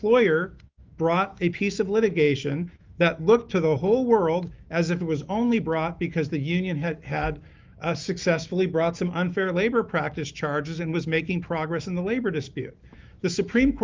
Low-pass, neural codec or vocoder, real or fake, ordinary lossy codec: 7.2 kHz; autoencoder, 48 kHz, 128 numbers a frame, DAC-VAE, trained on Japanese speech; fake; Opus, 24 kbps